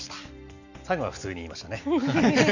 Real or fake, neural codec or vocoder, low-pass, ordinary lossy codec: real; none; 7.2 kHz; none